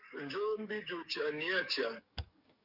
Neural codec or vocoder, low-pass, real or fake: codec, 44.1 kHz, 7.8 kbps, DAC; 5.4 kHz; fake